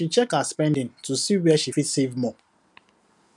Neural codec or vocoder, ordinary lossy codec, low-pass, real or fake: none; none; 10.8 kHz; real